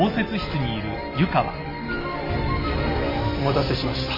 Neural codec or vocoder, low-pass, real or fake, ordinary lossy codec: none; 5.4 kHz; real; MP3, 24 kbps